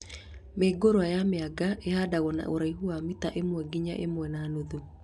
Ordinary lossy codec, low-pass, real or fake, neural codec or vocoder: none; none; real; none